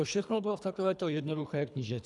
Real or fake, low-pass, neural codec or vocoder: fake; 10.8 kHz; codec, 24 kHz, 3 kbps, HILCodec